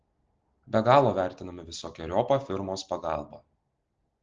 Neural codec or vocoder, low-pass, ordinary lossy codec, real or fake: none; 7.2 kHz; Opus, 16 kbps; real